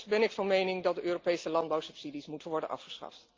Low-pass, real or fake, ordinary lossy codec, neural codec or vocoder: 7.2 kHz; real; Opus, 32 kbps; none